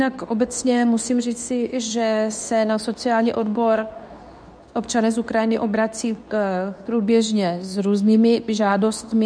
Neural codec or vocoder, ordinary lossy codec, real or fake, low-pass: codec, 24 kHz, 0.9 kbps, WavTokenizer, medium speech release version 2; MP3, 96 kbps; fake; 9.9 kHz